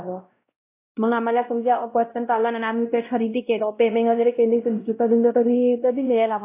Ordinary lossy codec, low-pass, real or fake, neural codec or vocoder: AAC, 24 kbps; 3.6 kHz; fake; codec, 16 kHz, 0.5 kbps, X-Codec, WavLM features, trained on Multilingual LibriSpeech